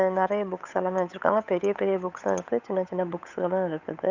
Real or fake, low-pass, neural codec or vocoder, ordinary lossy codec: fake; 7.2 kHz; codec, 16 kHz, 8 kbps, FunCodec, trained on Chinese and English, 25 frames a second; none